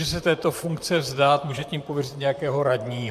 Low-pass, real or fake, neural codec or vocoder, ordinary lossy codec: 14.4 kHz; fake; vocoder, 44.1 kHz, 128 mel bands, Pupu-Vocoder; MP3, 96 kbps